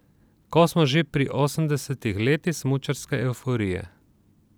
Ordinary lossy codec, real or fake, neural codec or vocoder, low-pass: none; real; none; none